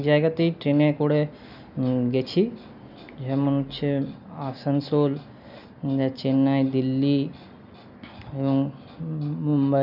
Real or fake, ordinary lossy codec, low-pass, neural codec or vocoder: real; none; 5.4 kHz; none